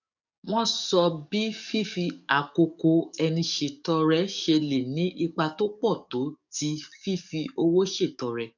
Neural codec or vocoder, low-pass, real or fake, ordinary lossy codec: codec, 44.1 kHz, 7.8 kbps, DAC; 7.2 kHz; fake; none